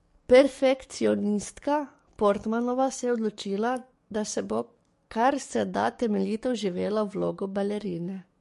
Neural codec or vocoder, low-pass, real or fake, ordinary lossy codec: codec, 44.1 kHz, 7.8 kbps, DAC; 14.4 kHz; fake; MP3, 48 kbps